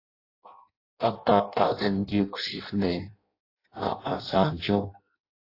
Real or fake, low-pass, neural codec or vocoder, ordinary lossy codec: fake; 5.4 kHz; codec, 16 kHz in and 24 kHz out, 0.6 kbps, FireRedTTS-2 codec; AAC, 32 kbps